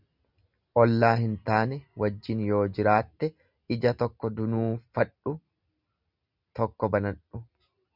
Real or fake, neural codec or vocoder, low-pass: real; none; 5.4 kHz